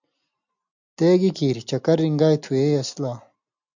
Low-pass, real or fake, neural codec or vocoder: 7.2 kHz; real; none